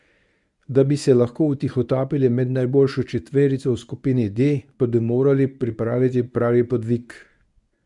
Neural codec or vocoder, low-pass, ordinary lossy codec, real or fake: codec, 24 kHz, 0.9 kbps, WavTokenizer, medium speech release version 1; 10.8 kHz; none; fake